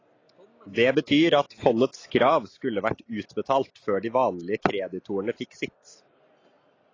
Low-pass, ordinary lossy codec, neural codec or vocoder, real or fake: 7.2 kHz; AAC, 32 kbps; none; real